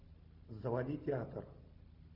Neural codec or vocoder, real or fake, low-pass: none; real; 5.4 kHz